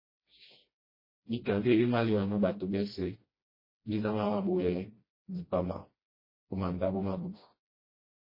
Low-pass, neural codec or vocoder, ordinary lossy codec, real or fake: 5.4 kHz; codec, 16 kHz, 1 kbps, FreqCodec, smaller model; MP3, 24 kbps; fake